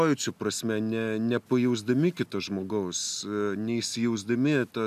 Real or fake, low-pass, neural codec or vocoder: real; 14.4 kHz; none